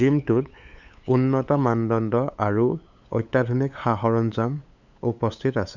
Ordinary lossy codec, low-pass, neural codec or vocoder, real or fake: none; 7.2 kHz; codec, 16 kHz, 8 kbps, FunCodec, trained on LibriTTS, 25 frames a second; fake